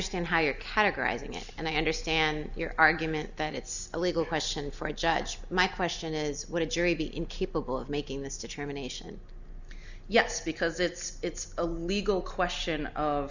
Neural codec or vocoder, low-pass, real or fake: none; 7.2 kHz; real